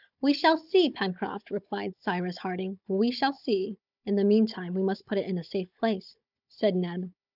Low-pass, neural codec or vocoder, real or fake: 5.4 kHz; codec, 16 kHz, 16 kbps, FunCodec, trained on Chinese and English, 50 frames a second; fake